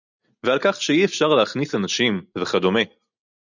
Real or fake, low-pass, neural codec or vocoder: real; 7.2 kHz; none